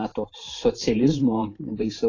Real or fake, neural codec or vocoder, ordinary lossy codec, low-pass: fake; vocoder, 44.1 kHz, 128 mel bands every 512 samples, BigVGAN v2; AAC, 32 kbps; 7.2 kHz